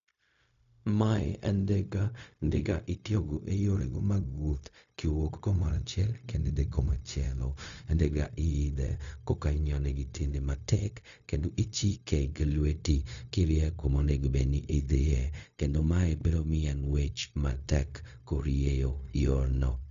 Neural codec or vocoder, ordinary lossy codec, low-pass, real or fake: codec, 16 kHz, 0.4 kbps, LongCat-Audio-Codec; AAC, 96 kbps; 7.2 kHz; fake